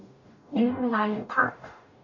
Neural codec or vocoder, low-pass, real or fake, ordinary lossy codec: codec, 44.1 kHz, 0.9 kbps, DAC; 7.2 kHz; fake; none